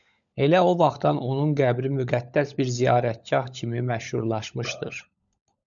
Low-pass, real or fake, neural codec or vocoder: 7.2 kHz; fake; codec, 16 kHz, 16 kbps, FunCodec, trained on LibriTTS, 50 frames a second